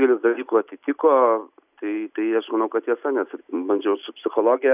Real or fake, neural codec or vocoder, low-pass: real; none; 3.6 kHz